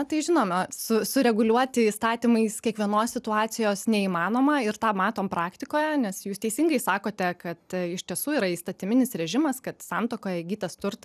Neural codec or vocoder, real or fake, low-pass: none; real; 14.4 kHz